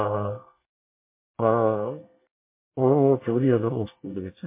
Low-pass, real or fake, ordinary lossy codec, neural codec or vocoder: 3.6 kHz; fake; MP3, 24 kbps; codec, 24 kHz, 1 kbps, SNAC